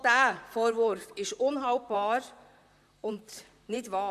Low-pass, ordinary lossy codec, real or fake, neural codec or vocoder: 14.4 kHz; none; fake; vocoder, 44.1 kHz, 128 mel bands, Pupu-Vocoder